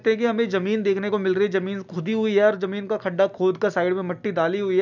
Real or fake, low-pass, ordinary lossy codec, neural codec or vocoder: real; 7.2 kHz; none; none